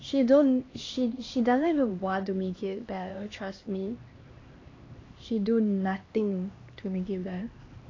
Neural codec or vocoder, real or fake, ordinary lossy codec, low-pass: codec, 16 kHz, 2 kbps, X-Codec, HuBERT features, trained on LibriSpeech; fake; AAC, 32 kbps; 7.2 kHz